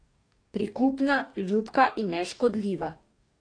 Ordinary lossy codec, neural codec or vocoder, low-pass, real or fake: AAC, 48 kbps; codec, 44.1 kHz, 2.6 kbps, DAC; 9.9 kHz; fake